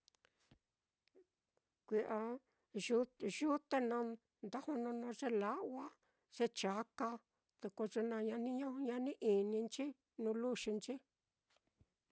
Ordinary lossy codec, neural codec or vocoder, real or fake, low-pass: none; none; real; none